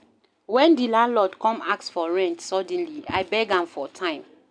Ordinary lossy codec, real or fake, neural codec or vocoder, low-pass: none; real; none; 9.9 kHz